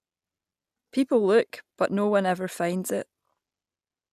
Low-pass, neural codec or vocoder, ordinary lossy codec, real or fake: 14.4 kHz; none; none; real